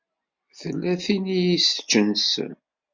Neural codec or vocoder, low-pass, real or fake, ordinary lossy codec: none; 7.2 kHz; real; MP3, 48 kbps